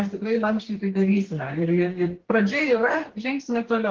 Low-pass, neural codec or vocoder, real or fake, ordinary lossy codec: 7.2 kHz; codec, 44.1 kHz, 2.6 kbps, DAC; fake; Opus, 16 kbps